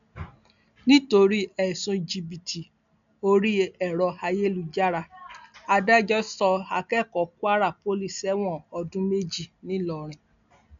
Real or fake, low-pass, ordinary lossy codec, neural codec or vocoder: real; 7.2 kHz; none; none